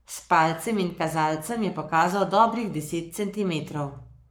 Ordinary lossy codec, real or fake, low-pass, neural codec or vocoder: none; fake; none; codec, 44.1 kHz, 7.8 kbps, Pupu-Codec